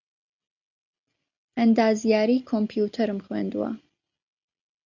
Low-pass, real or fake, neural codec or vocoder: 7.2 kHz; real; none